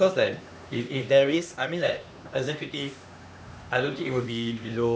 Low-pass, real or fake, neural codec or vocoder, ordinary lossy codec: none; fake; codec, 16 kHz, 2 kbps, X-Codec, HuBERT features, trained on LibriSpeech; none